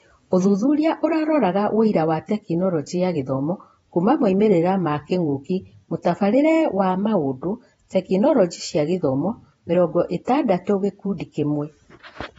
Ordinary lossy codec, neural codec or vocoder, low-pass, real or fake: AAC, 24 kbps; vocoder, 48 kHz, 128 mel bands, Vocos; 19.8 kHz; fake